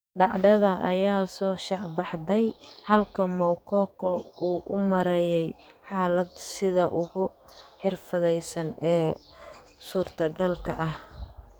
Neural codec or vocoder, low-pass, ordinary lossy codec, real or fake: codec, 44.1 kHz, 2.6 kbps, SNAC; none; none; fake